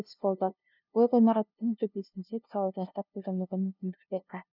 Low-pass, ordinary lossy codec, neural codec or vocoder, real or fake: 5.4 kHz; none; codec, 16 kHz, 0.5 kbps, FunCodec, trained on LibriTTS, 25 frames a second; fake